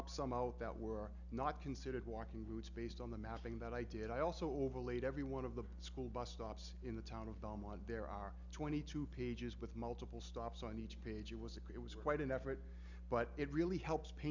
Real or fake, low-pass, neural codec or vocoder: real; 7.2 kHz; none